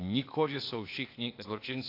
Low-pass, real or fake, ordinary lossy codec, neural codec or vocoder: 5.4 kHz; fake; AAC, 32 kbps; codec, 16 kHz, 0.8 kbps, ZipCodec